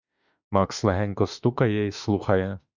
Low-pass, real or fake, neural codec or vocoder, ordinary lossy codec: 7.2 kHz; fake; autoencoder, 48 kHz, 32 numbers a frame, DAC-VAE, trained on Japanese speech; Opus, 64 kbps